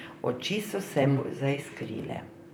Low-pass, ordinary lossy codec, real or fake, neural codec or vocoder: none; none; fake; vocoder, 44.1 kHz, 128 mel bands, Pupu-Vocoder